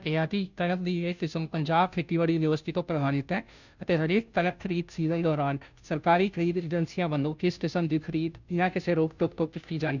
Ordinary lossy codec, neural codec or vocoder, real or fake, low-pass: none; codec, 16 kHz, 0.5 kbps, FunCodec, trained on Chinese and English, 25 frames a second; fake; 7.2 kHz